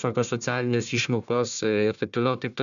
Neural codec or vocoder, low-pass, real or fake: codec, 16 kHz, 1 kbps, FunCodec, trained on Chinese and English, 50 frames a second; 7.2 kHz; fake